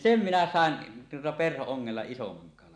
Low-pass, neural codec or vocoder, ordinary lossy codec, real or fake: 9.9 kHz; none; none; real